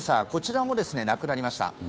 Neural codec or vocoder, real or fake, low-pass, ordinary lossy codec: codec, 16 kHz, 2 kbps, FunCodec, trained on Chinese and English, 25 frames a second; fake; none; none